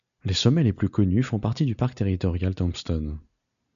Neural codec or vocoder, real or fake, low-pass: none; real; 7.2 kHz